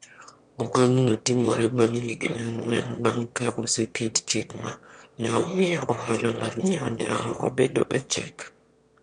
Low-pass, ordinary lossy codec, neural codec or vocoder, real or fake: 9.9 kHz; MP3, 64 kbps; autoencoder, 22.05 kHz, a latent of 192 numbers a frame, VITS, trained on one speaker; fake